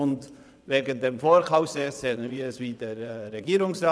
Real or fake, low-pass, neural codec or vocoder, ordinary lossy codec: fake; none; vocoder, 22.05 kHz, 80 mel bands, WaveNeXt; none